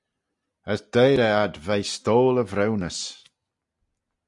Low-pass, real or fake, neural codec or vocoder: 10.8 kHz; real; none